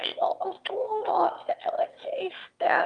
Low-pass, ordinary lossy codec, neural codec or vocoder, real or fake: 9.9 kHz; Opus, 32 kbps; autoencoder, 22.05 kHz, a latent of 192 numbers a frame, VITS, trained on one speaker; fake